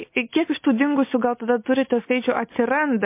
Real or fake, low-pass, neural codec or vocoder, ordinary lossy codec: real; 3.6 kHz; none; MP3, 24 kbps